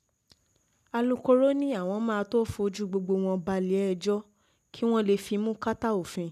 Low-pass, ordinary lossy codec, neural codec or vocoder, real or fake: 14.4 kHz; none; none; real